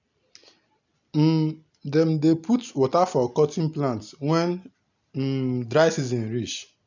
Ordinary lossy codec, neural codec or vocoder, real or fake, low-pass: none; none; real; 7.2 kHz